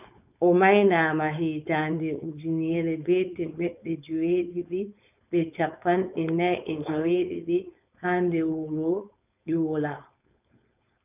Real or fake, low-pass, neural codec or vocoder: fake; 3.6 kHz; codec, 16 kHz, 4.8 kbps, FACodec